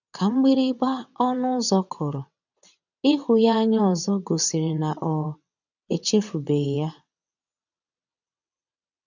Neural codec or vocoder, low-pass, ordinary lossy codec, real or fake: vocoder, 22.05 kHz, 80 mel bands, WaveNeXt; 7.2 kHz; none; fake